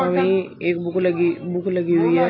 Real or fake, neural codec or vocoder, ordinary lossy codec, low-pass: real; none; AAC, 32 kbps; 7.2 kHz